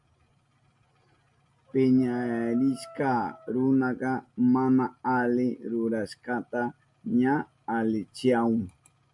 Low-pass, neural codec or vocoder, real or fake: 10.8 kHz; none; real